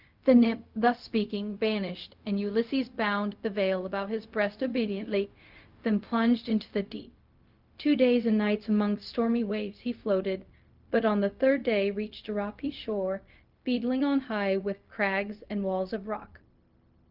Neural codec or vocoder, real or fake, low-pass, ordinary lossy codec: codec, 16 kHz, 0.4 kbps, LongCat-Audio-Codec; fake; 5.4 kHz; Opus, 24 kbps